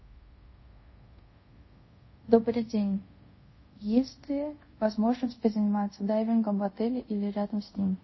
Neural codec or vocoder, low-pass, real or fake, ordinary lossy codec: codec, 24 kHz, 0.5 kbps, DualCodec; 7.2 kHz; fake; MP3, 24 kbps